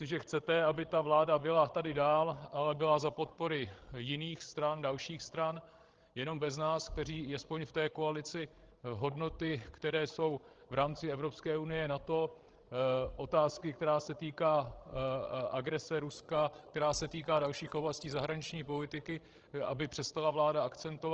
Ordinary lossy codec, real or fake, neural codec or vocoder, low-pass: Opus, 16 kbps; fake; codec, 16 kHz, 16 kbps, FreqCodec, larger model; 7.2 kHz